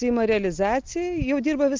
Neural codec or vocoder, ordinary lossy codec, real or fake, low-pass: none; Opus, 32 kbps; real; 7.2 kHz